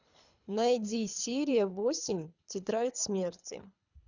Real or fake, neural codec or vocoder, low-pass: fake; codec, 24 kHz, 3 kbps, HILCodec; 7.2 kHz